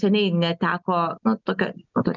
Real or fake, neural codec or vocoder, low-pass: real; none; 7.2 kHz